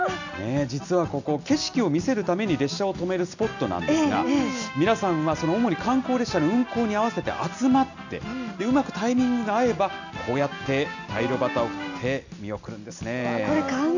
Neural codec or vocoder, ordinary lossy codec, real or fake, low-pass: none; none; real; 7.2 kHz